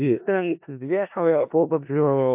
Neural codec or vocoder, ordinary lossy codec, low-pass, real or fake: codec, 16 kHz in and 24 kHz out, 0.4 kbps, LongCat-Audio-Codec, four codebook decoder; MP3, 32 kbps; 3.6 kHz; fake